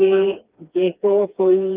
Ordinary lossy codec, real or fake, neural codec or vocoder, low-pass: Opus, 32 kbps; fake; codec, 16 kHz, 2 kbps, FreqCodec, smaller model; 3.6 kHz